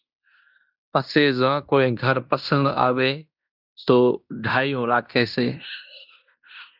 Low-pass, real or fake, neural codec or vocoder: 5.4 kHz; fake; codec, 16 kHz in and 24 kHz out, 0.9 kbps, LongCat-Audio-Codec, fine tuned four codebook decoder